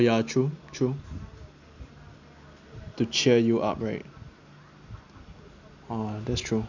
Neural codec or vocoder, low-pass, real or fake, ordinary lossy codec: none; 7.2 kHz; real; none